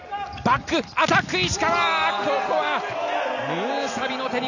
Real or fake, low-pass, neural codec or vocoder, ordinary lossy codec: real; 7.2 kHz; none; none